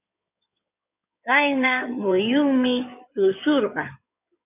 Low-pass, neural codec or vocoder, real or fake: 3.6 kHz; codec, 16 kHz in and 24 kHz out, 2.2 kbps, FireRedTTS-2 codec; fake